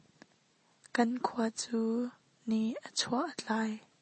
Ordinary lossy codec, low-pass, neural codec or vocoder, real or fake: MP3, 32 kbps; 10.8 kHz; none; real